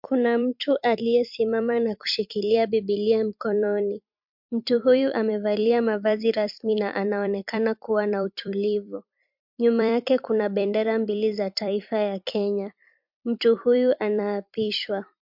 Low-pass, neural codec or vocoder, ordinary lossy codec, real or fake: 5.4 kHz; vocoder, 44.1 kHz, 128 mel bands every 256 samples, BigVGAN v2; MP3, 48 kbps; fake